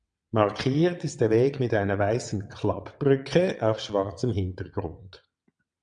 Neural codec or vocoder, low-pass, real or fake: vocoder, 22.05 kHz, 80 mel bands, WaveNeXt; 9.9 kHz; fake